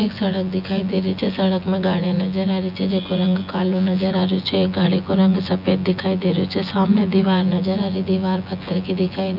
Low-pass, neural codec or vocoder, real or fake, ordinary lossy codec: 5.4 kHz; vocoder, 24 kHz, 100 mel bands, Vocos; fake; none